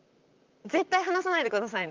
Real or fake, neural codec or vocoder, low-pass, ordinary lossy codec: fake; vocoder, 44.1 kHz, 128 mel bands, Pupu-Vocoder; 7.2 kHz; Opus, 24 kbps